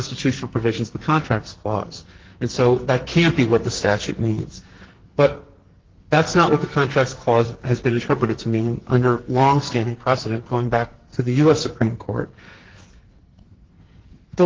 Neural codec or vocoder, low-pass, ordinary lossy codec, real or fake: codec, 44.1 kHz, 2.6 kbps, SNAC; 7.2 kHz; Opus, 16 kbps; fake